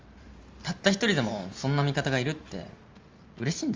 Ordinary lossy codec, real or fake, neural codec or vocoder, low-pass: Opus, 32 kbps; fake; vocoder, 44.1 kHz, 128 mel bands every 512 samples, BigVGAN v2; 7.2 kHz